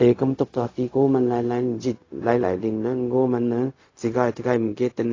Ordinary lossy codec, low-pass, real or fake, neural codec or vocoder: AAC, 32 kbps; 7.2 kHz; fake; codec, 16 kHz, 0.4 kbps, LongCat-Audio-Codec